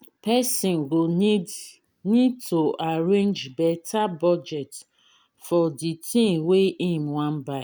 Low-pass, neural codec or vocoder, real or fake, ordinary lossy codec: none; none; real; none